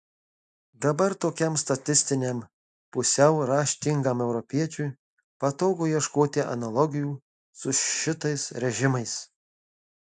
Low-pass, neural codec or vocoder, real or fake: 10.8 kHz; none; real